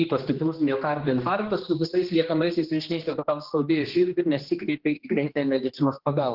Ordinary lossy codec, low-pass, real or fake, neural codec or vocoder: Opus, 16 kbps; 5.4 kHz; fake; codec, 16 kHz, 2 kbps, X-Codec, HuBERT features, trained on general audio